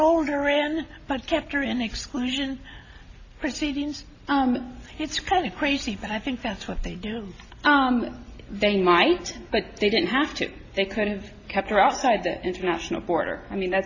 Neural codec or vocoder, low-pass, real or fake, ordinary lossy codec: none; 7.2 kHz; real; AAC, 48 kbps